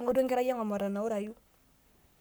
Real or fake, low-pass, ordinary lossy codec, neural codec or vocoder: fake; none; none; codec, 44.1 kHz, 7.8 kbps, Pupu-Codec